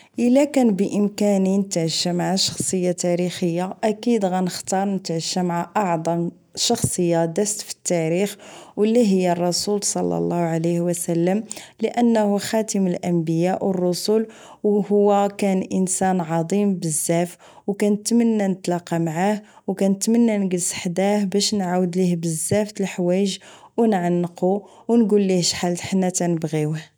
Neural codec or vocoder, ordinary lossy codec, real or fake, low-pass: none; none; real; none